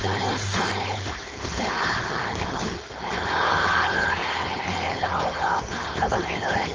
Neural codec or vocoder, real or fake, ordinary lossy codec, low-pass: codec, 16 kHz, 4.8 kbps, FACodec; fake; Opus, 24 kbps; 7.2 kHz